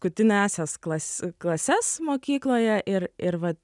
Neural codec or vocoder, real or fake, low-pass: none; real; 10.8 kHz